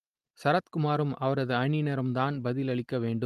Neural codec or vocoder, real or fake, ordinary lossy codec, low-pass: none; real; Opus, 24 kbps; 14.4 kHz